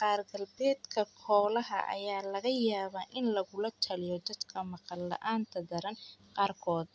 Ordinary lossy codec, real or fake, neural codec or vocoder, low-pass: none; real; none; none